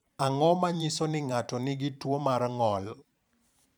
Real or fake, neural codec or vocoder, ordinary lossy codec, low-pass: fake; vocoder, 44.1 kHz, 128 mel bands every 512 samples, BigVGAN v2; none; none